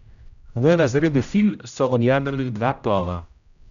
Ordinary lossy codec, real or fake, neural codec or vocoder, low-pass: none; fake; codec, 16 kHz, 0.5 kbps, X-Codec, HuBERT features, trained on general audio; 7.2 kHz